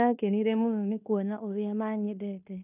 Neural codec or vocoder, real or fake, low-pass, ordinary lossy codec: codec, 16 kHz in and 24 kHz out, 0.9 kbps, LongCat-Audio-Codec, fine tuned four codebook decoder; fake; 3.6 kHz; none